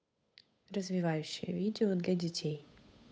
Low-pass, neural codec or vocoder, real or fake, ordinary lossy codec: none; codec, 16 kHz, 8 kbps, FunCodec, trained on Chinese and English, 25 frames a second; fake; none